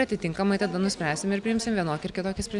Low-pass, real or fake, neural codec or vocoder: 10.8 kHz; real; none